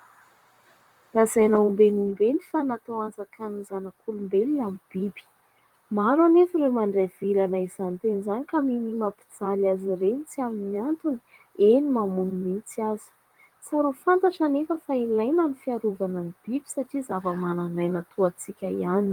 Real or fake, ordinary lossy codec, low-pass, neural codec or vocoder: fake; Opus, 32 kbps; 19.8 kHz; vocoder, 44.1 kHz, 128 mel bands, Pupu-Vocoder